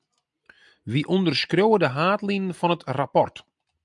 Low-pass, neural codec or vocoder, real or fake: 10.8 kHz; none; real